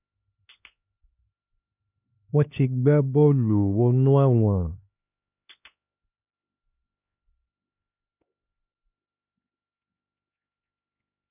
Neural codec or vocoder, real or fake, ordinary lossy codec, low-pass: codec, 16 kHz, 2 kbps, X-Codec, HuBERT features, trained on LibriSpeech; fake; none; 3.6 kHz